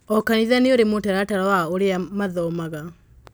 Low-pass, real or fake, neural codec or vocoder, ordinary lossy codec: none; real; none; none